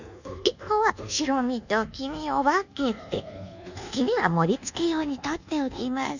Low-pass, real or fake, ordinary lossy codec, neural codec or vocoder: 7.2 kHz; fake; none; codec, 24 kHz, 1.2 kbps, DualCodec